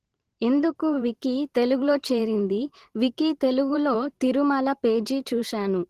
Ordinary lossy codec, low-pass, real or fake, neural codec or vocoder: Opus, 16 kbps; 14.4 kHz; fake; vocoder, 44.1 kHz, 128 mel bands every 512 samples, BigVGAN v2